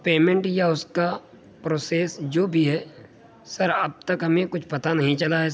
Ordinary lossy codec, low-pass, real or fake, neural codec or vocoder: none; none; real; none